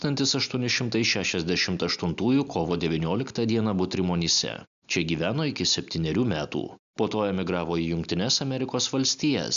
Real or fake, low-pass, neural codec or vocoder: real; 7.2 kHz; none